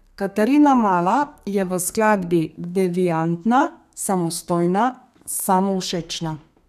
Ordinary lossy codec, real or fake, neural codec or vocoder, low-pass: none; fake; codec, 32 kHz, 1.9 kbps, SNAC; 14.4 kHz